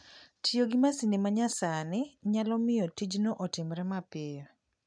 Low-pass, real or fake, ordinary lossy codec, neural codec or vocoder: 9.9 kHz; real; none; none